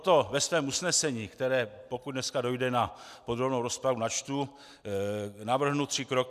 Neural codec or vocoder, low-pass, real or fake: none; 14.4 kHz; real